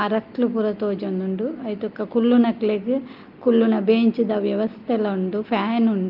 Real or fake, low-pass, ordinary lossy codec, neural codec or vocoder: real; 5.4 kHz; Opus, 32 kbps; none